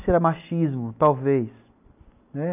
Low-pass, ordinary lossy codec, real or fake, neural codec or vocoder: 3.6 kHz; none; real; none